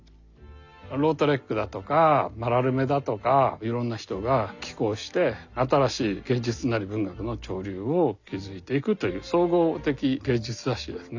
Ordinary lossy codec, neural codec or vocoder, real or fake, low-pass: none; none; real; 7.2 kHz